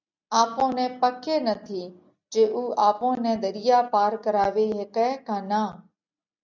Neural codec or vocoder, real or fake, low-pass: none; real; 7.2 kHz